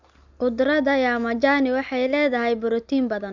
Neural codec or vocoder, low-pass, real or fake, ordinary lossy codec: none; 7.2 kHz; real; Opus, 64 kbps